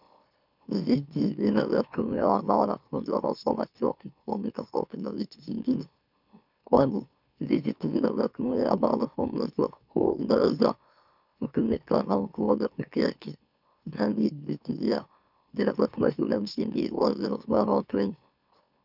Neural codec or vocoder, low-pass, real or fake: autoencoder, 44.1 kHz, a latent of 192 numbers a frame, MeloTTS; 5.4 kHz; fake